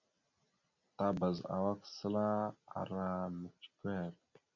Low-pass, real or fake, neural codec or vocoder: 7.2 kHz; real; none